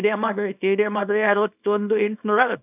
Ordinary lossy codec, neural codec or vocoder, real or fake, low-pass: none; codec, 24 kHz, 0.9 kbps, WavTokenizer, small release; fake; 3.6 kHz